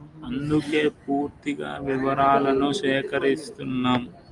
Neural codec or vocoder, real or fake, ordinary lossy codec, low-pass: none; real; Opus, 32 kbps; 10.8 kHz